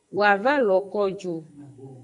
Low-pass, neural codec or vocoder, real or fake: 10.8 kHz; codec, 44.1 kHz, 2.6 kbps, SNAC; fake